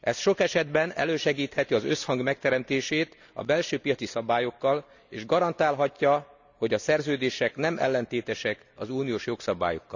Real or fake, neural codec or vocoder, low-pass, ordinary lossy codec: real; none; 7.2 kHz; none